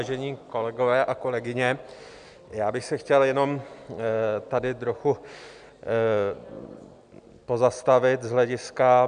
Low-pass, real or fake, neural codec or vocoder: 9.9 kHz; real; none